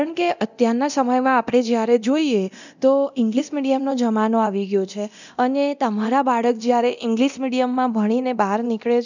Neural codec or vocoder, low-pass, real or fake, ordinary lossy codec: codec, 24 kHz, 0.9 kbps, DualCodec; 7.2 kHz; fake; none